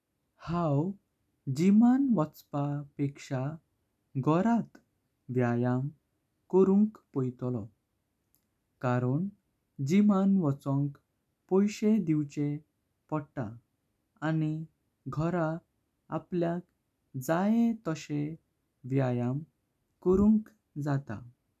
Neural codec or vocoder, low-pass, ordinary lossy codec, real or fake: none; 14.4 kHz; none; real